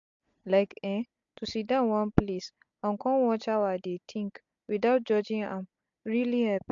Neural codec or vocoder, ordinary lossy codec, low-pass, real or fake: none; AAC, 64 kbps; 7.2 kHz; real